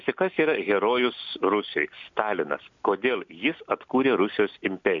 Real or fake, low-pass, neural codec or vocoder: real; 7.2 kHz; none